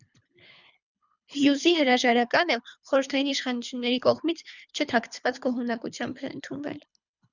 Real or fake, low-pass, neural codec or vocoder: fake; 7.2 kHz; codec, 24 kHz, 3 kbps, HILCodec